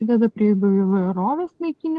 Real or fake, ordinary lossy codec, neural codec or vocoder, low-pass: real; Opus, 16 kbps; none; 10.8 kHz